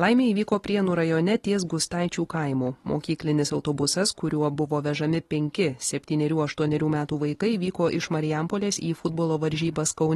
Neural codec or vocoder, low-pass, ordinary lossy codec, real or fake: none; 19.8 kHz; AAC, 32 kbps; real